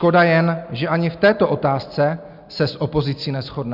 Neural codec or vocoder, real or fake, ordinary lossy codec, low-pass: none; real; Opus, 64 kbps; 5.4 kHz